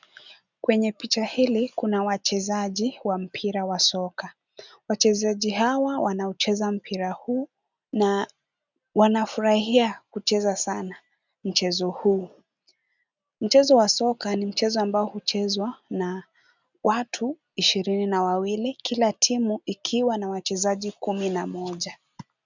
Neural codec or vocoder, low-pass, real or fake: none; 7.2 kHz; real